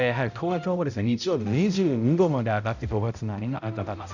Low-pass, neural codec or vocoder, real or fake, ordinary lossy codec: 7.2 kHz; codec, 16 kHz, 0.5 kbps, X-Codec, HuBERT features, trained on balanced general audio; fake; none